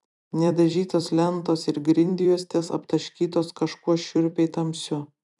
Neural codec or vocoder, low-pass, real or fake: vocoder, 44.1 kHz, 128 mel bands every 256 samples, BigVGAN v2; 10.8 kHz; fake